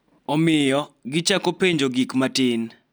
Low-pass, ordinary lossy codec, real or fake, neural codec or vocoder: none; none; real; none